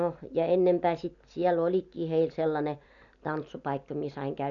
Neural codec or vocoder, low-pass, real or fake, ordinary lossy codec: none; 7.2 kHz; real; none